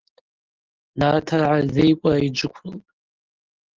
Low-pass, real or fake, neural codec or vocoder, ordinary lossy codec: 7.2 kHz; real; none; Opus, 16 kbps